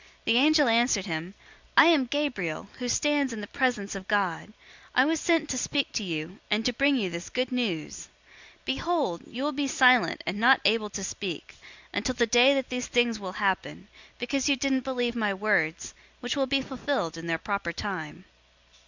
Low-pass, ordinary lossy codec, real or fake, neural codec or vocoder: 7.2 kHz; Opus, 64 kbps; real; none